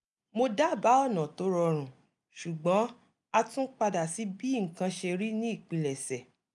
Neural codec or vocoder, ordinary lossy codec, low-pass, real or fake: none; none; 10.8 kHz; real